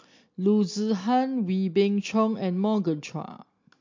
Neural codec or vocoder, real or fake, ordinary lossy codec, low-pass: none; real; MP3, 48 kbps; 7.2 kHz